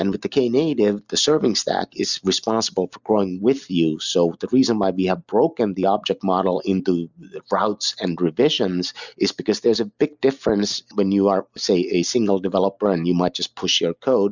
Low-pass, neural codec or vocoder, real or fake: 7.2 kHz; none; real